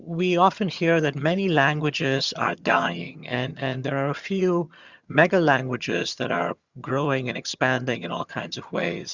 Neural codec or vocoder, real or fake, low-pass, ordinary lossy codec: vocoder, 22.05 kHz, 80 mel bands, HiFi-GAN; fake; 7.2 kHz; Opus, 64 kbps